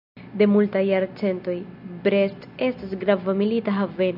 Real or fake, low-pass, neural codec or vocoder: real; 5.4 kHz; none